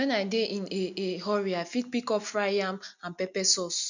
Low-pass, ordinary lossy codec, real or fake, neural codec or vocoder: 7.2 kHz; none; real; none